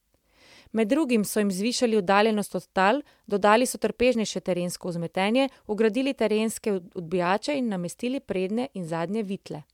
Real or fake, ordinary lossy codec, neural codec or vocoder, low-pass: real; MP3, 96 kbps; none; 19.8 kHz